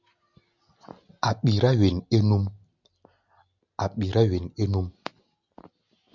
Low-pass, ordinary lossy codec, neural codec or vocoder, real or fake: 7.2 kHz; MP3, 64 kbps; none; real